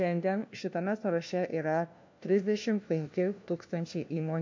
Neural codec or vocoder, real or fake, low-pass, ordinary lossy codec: codec, 16 kHz, 1 kbps, FunCodec, trained on LibriTTS, 50 frames a second; fake; 7.2 kHz; MP3, 48 kbps